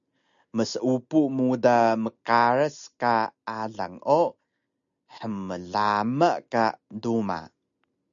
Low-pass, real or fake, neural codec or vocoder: 7.2 kHz; real; none